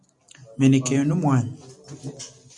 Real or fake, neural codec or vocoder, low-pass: real; none; 10.8 kHz